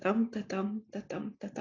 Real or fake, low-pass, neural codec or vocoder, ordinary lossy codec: real; 7.2 kHz; none; AAC, 32 kbps